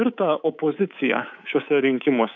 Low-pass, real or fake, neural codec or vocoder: 7.2 kHz; fake; codec, 24 kHz, 3.1 kbps, DualCodec